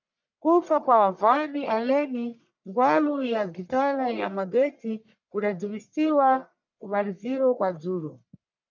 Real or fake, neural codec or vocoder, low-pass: fake; codec, 44.1 kHz, 1.7 kbps, Pupu-Codec; 7.2 kHz